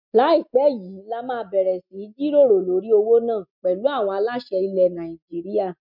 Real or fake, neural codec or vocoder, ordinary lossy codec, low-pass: real; none; MP3, 48 kbps; 5.4 kHz